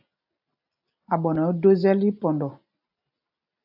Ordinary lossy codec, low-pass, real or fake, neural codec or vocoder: AAC, 48 kbps; 5.4 kHz; real; none